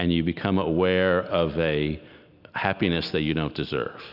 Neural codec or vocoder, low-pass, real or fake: none; 5.4 kHz; real